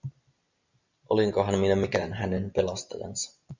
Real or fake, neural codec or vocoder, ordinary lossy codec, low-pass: real; none; Opus, 64 kbps; 7.2 kHz